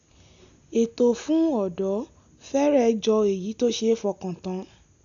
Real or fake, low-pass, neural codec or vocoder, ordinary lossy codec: real; 7.2 kHz; none; none